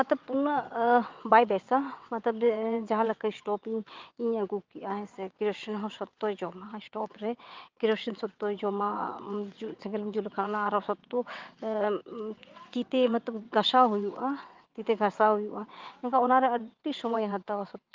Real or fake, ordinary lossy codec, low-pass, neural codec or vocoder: fake; Opus, 24 kbps; 7.2 kHz; vocoder, 22.05 kHz, 80 mel bands, WaveNeXt